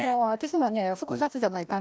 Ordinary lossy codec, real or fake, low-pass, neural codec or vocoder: none; fake; none; codec, 16 kHz, 1 kbps, FreqCodec, larger model